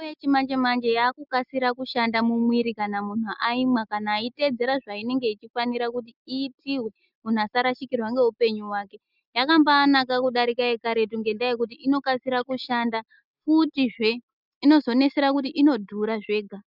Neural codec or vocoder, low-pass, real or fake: none; 5.4 kHz; real